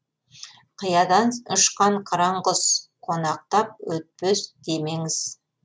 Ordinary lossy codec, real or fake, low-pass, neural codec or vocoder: none; real; none; none